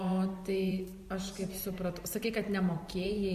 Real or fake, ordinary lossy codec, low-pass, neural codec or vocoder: fake; MP3, 64 kbps; 14.4 kHz; vocoder, 44.1 kHz, 128 mel bands every 512 samples, BigVGAN v2